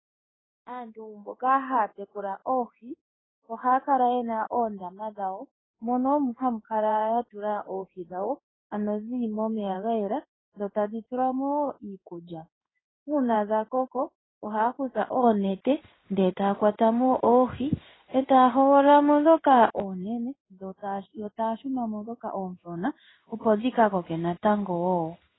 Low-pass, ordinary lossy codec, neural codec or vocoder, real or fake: 7.2 kHz; AAC, 16 kbps; codec, 16 kHz in and 24 kHz out, 1 kbps, XY-Tokenizer; fake